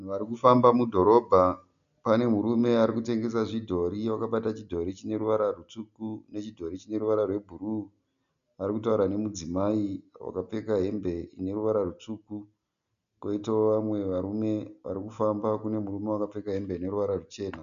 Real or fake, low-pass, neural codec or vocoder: real; 7.2 kHz; none